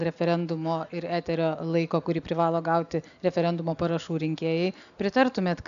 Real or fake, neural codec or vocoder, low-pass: real; none; 7.2 kHz